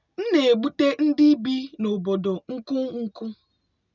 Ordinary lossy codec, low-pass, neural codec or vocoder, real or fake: none; 7.2 kHz; none; real